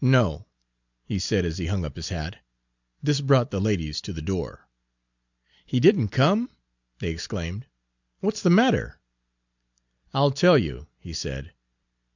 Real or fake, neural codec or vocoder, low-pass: real; none; 7.2 kHz